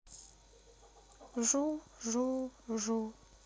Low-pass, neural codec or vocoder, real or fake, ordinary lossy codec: none; none; real; none